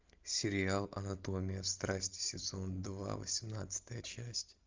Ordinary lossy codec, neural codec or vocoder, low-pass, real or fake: Opus, 24 kbps; none; 7.2 kHz; real